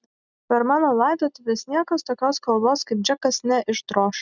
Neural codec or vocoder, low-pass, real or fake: none; 7.2 kHz; real